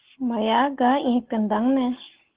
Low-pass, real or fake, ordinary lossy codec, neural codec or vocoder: 3.6 kHz; real; Opus, 16 kbps; none